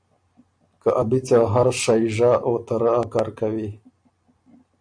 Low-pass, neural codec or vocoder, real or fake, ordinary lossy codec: 9.9 kHz; none; real; AAC, 64 kbps